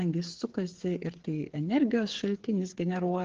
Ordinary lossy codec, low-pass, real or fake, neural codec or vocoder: Opus, 16 kbps; 7.2 kHz; fake; codec, 16 kHz, 8 kbps, FreqCodec, smaller model